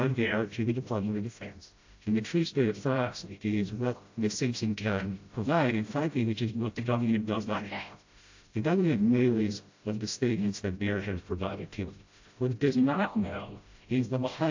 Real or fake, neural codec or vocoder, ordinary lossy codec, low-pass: fake; codec, 16 kHz, 0.5 kbps, FreqCodec, smaller model; AAC, 48 kbps; 7.2 kHz